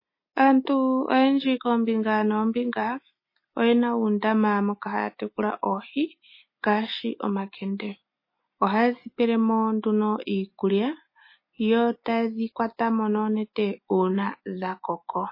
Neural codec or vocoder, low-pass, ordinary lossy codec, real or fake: none; 5.4 kHz; MP3, 24 kbps; real